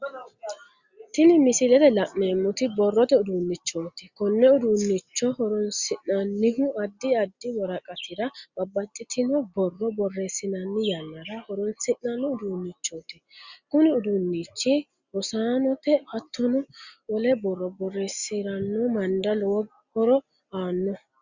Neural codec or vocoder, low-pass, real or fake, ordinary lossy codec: none; 7.2 kHz; real; Opus, 64 kbps